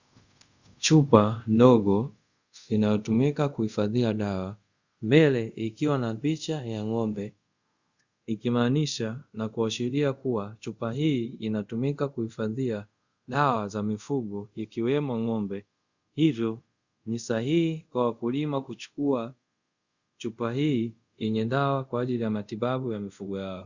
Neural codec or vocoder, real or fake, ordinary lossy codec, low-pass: codec, 24 kHz, 0.5 kbps, DualCodec; fake; Opus, 64 kbps; 7.2 kHz